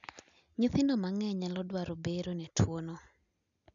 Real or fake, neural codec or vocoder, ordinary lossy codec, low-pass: real; none; none; 7.2 kHz